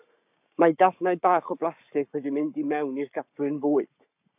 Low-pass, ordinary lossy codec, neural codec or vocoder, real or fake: 3.6 kHz; AAC, 32 kbps; codec, 44.1 kHz, 7.8 kbps, Pupu-Codec; fake